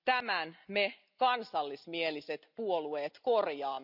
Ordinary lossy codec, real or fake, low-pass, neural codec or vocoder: none; real; 5.4 kHz; none